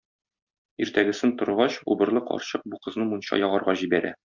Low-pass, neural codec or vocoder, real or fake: 7.2 kHz; none; real